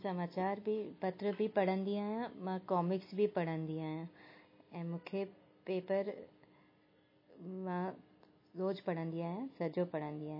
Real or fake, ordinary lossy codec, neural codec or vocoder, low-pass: real; MP3, 24 kbps; none; 5.4 kHz